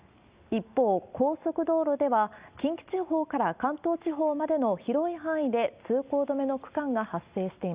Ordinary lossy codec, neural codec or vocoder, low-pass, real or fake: Opus, 64 kbps; none; 3.6 kHz; real